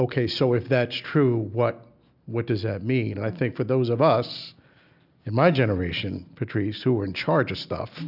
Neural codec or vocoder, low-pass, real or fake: none; 5.4 kHz; real